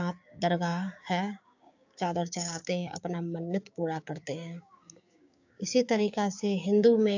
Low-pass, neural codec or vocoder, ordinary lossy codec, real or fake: 7.2 kHz; codec, 16 kHz, 6 kbps, DAC; AAC, 48 kbps; fake